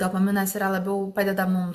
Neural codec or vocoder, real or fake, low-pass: none; real; 14.4 kHz